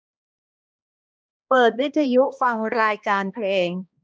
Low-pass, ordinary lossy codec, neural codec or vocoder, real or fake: none; none; codec, 16 kHz, 1 kbps, X-Codec, HuBERT features, trained on balanced general audio; fake